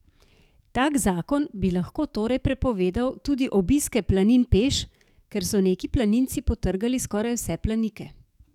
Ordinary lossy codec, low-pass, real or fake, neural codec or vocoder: none; 19.8 kHz; fake; codec, 44.1 kHz, 7.8 kbps, DAC